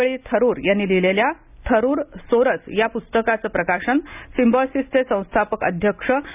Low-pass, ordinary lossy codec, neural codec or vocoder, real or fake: 3.6 kHz; none; none; real